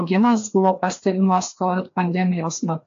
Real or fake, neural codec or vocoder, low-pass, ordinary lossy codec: fake; codec, 16 kHz, 2 kbps, FreqCodec, larger model; 7.2 kHz; AAC, 48 kbps